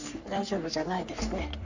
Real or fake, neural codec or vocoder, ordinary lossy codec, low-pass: fake; codec, 44.1 kHz, 3.4 kbps, Pupu-Codec; AAC, 48 kbps; 7.2 kHz